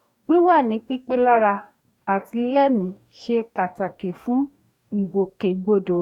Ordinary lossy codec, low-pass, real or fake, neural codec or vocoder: none; 19.8 kHz; fake; codec, 44.1 kHz, 2.6 kbps, DAC